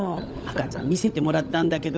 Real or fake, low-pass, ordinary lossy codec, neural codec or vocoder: fake; none; none; codec, 16 kHz, 16 kbps, FunCodec, trained on LibriTTS, 50 frames a second